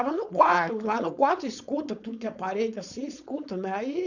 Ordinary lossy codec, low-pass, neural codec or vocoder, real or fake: none; 7.2 kHz; codec, 16 kHz, 4.8 kbps, FACodec; fake